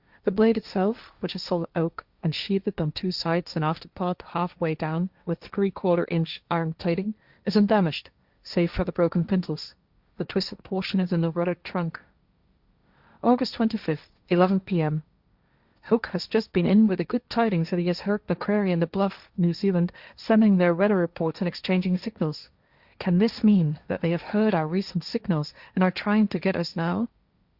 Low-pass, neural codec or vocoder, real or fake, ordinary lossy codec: 5.4 kHz; codec, 16 kHz, 1.1 kbps, Voila-Tokenizer; fake; Opus, 64 kbps